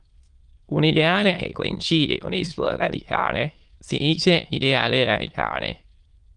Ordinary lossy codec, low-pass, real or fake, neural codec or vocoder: Opus, 24 kbps; 9.9 kHz; fake; autoencoder, 22.05 kHz, a latent of 192 numbers a frame, VITS, trained on many speakers